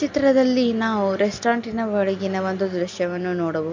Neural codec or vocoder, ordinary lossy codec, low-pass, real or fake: none; MP3, 64 kbps; 7.2 kHz; real